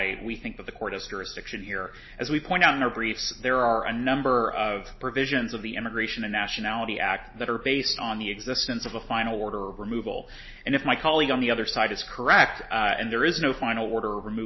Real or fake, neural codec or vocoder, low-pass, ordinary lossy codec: real; none; 7.2 kHz; MP3, 24 kbps